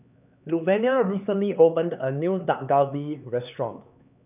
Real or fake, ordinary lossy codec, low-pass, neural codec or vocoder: fake; none; 3.6 kHz; codec, 16 kHz, 4 kbps, X-Codec, HuBERT features, trained on LibriSpeech